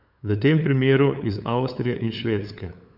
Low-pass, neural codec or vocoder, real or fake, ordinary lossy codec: 5.4 kHz; codec, 16 kHz, 8 kbps, FunCodec, trained on LibriTTS, 25 frames a second; fake; none